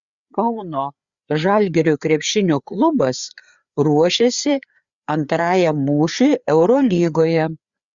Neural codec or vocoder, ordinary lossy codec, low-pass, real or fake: codec, 16 kHz, 4 kbps, FreqCodec, larger model; Opus, 64 kbps; 7.2 kHz; fake